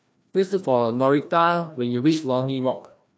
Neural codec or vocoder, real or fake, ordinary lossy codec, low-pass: codec, 16 kHz, 1 kbps, FreqCodec, larger model; fake; none; none